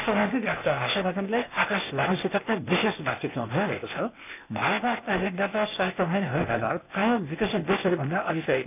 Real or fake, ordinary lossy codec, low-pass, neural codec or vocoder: fake; none; 3.6 kHz; codec, 16 kHz, 0.8 kbps, ZipCodec